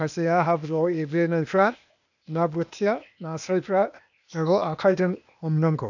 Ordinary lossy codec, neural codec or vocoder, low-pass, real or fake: none; codec, 16 kHz, 0.8 kbps, ZipCodec; 7.2 kHz; fake